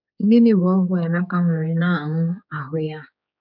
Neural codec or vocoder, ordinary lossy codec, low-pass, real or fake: codec, 16 kHz, 4 kbps, X-Codec, HuBERT features, trained on general audio; none; 5.4 kHz; fake